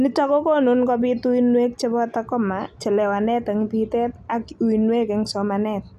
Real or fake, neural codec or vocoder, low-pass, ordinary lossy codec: real; none; 14.4 kHz; none